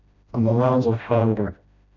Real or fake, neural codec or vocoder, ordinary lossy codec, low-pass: fake; codec, 16 kHz, 0.5 kbps, FreqCodec, smaller model; none; 7.2 kHz